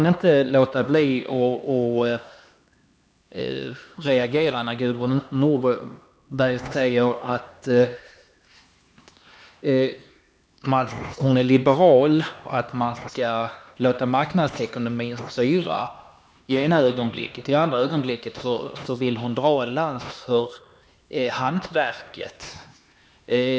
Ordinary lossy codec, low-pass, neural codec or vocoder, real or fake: none; none; codec, 16 kHz, 2 kbps, X-Codec, HuBERT features, trained on LibriSpeech; fake